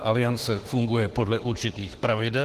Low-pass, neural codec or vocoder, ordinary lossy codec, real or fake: 14.4 kHz; autoencoder, 48 kHz, 32 numbers a frame, DAC-VAE, trained on Japanese speech; Opus, 16 kbps; fake